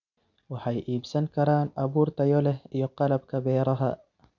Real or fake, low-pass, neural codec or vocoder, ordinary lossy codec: real; 7.2 kHz; none; none